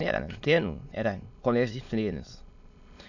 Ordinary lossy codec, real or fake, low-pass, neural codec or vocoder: none; fake; 7.2 kHz; autoencoder, 22.05 kHz, a latent of 192 numbers a frame, VITS, trained on many speakers